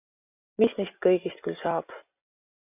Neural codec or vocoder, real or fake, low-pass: none; real; 3.6 kHz